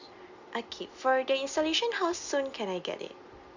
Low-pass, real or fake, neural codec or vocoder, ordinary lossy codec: 7.2 kHz; real; none; Opus, 64 kbps